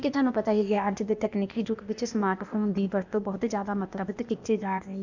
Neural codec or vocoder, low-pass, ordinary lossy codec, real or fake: codec, 16 kHz, 0.8 kbps, ZipCodec; 7.2 kHz; none; fake